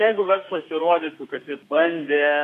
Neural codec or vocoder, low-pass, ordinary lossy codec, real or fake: codec, 32 kHz, 1.9 kbps, SNAC; 14.4 kHz; MP3, 64 kbps; fake